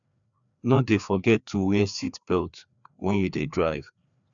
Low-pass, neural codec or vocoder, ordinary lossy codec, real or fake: 7.2 kHz; codec, 16 kHz, 2 kbps, FreqCodec, larger model; none; fake